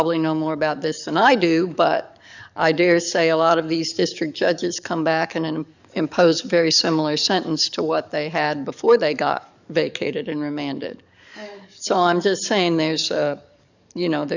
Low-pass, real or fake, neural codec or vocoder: 7.2 kHz; fake; codec, 44.1 kHz, 7.8 kbps, DAC